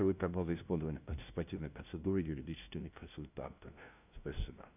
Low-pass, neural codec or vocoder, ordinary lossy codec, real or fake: 3.6 kHz; codec, 16 kHz, 0.5 kbps, FunCodec, trained on LibriTTS, 25 frames a second; none; fake